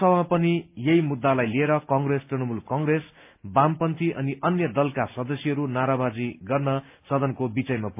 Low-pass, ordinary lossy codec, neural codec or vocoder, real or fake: 3.6 kHz; MP3, 32 kbps; none; real